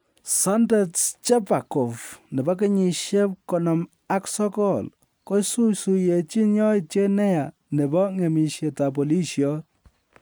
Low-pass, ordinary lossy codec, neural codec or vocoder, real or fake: none; none; none; real